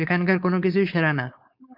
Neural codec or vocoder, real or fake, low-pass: codec, 16 kHz, 4.8 kbps, FACodec; fake; 5.4 kHz